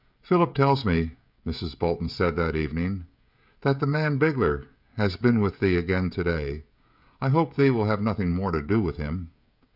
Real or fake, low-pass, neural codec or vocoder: fake; 5.4 kHz; codec, 16 kHz, 16 kbps, FreqCodec, smaller model